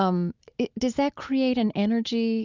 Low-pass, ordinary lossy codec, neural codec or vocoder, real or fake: 7.2 kHz; Opus, 64 kbps; none; real